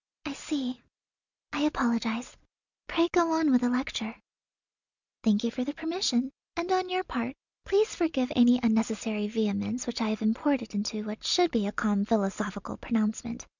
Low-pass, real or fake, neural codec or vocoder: 7.2 kHz; real; none